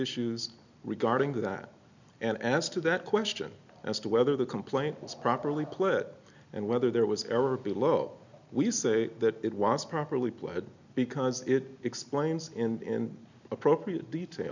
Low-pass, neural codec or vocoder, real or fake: 7.2 kHz; none; real